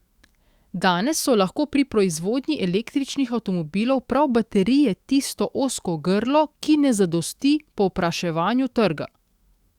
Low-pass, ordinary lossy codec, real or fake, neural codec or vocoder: 19.8 kHz; Opus, 64 kbps; fake; autoencoder, 48 kHz, 128 numbers a frame, DAC-VAE, trained on Japanese speech